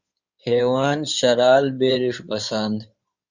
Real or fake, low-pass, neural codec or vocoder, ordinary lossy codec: fake; 7.2 kHz; codec, 16 kHz in and 24 kHz out, 2.2 kbps, FireRedTTS-2 codec; Opus, 64 kbps